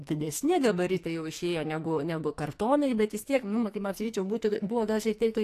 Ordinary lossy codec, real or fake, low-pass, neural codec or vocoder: AAC, 64 kbps; fake; 14.4 kHz; codec, 44.1 kHz, 2.6 kbps, SNAC